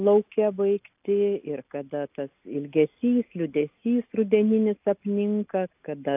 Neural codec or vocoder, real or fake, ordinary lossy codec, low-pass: none; real; AAC, 32 kbps; 3.6 kHz